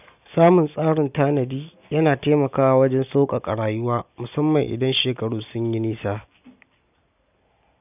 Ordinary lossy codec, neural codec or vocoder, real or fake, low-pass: none; none; real; 3.6 kHz